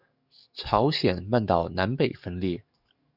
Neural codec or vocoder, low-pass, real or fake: codec, 44.1 kHz, 7.8 kbps, DAC; 5.4 kHz; fake